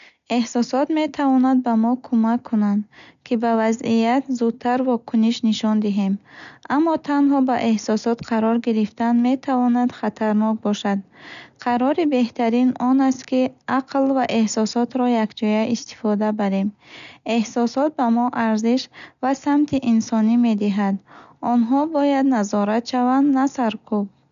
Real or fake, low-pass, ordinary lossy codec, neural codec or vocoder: real; 7.2 kHz; none; none